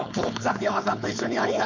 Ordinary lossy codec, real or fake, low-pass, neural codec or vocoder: none; fake; 7.2 kHz; codec, 16 kHz, 4.8 kbps, FACodec